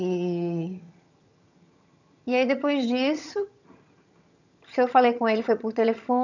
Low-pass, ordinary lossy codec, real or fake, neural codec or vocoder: 7.2 kHz; none; fake; vocoder, 22.05 kHz, 80 mel bands, HiFi-GAN